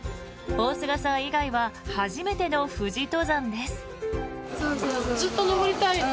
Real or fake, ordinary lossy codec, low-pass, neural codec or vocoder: real; none; none; none